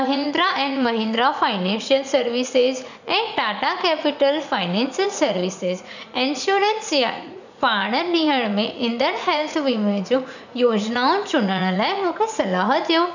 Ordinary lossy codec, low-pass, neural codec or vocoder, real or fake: none; 7.2 kHz; vocoder, 44.1 kHz, 80 mel bands, Vocos; fake